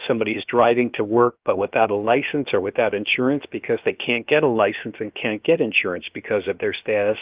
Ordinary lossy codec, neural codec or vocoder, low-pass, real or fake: Opus, 32 kbps; codec, 16 kHz, about 1 kbps, DyCAST, with the encoder's durations; 3.6 kHz; fake